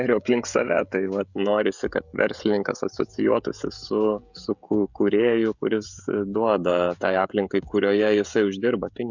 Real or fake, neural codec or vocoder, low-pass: fake; codec, 16 kHz, 16 kbps, FreqCodec, smaller model; 7.2 kHz